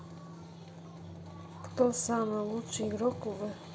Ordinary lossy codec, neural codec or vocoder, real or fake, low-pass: none; none; real; none